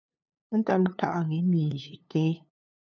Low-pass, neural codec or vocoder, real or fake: 7.2 kHz; codec, 16 kHz, 2 kbps, FunCodec, trained on LibriTTS, 25 frames a second; fake